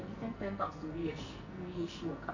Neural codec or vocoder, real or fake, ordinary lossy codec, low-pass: codec, 44.1 kHz, 2.6 kbps, SNAC; fake; none; 7.2 kHz